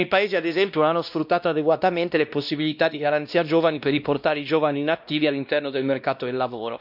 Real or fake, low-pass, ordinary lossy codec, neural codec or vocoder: fake; 5.4 kHz; none; codec, 16 kHz, 1 kbps, X-Codec, WavLM features, trained on Multilingual LibriSpeech